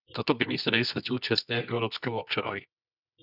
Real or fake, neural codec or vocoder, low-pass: fake; codec, 24 kHz, 0.9 kbps, WavTokenizer, medium music audio release; 5.4 kHz